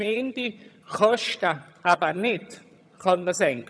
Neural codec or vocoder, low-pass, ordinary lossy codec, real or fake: vocoder, 22.05 kHz, 80 mel bands, HiFi-GAN; none; none; fake